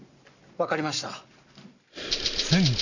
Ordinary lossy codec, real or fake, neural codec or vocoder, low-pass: none; real; none; 7.2 kHz